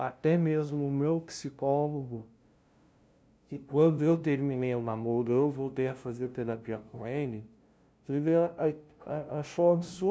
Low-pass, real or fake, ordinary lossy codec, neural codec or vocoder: none; fake; none; codec, 16 kHz, 0.5 kbps, FunCodec, trained on LibriTTS, 25 frames a second